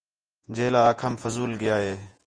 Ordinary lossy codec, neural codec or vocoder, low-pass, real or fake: Opus, 24 kbps; vocoder, 48 kHz, 128 mel bands, Vocos; 9.9 kHz; fake